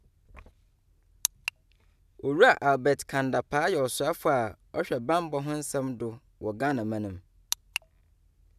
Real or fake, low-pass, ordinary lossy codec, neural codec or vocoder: real; 14.4 kHz; none; none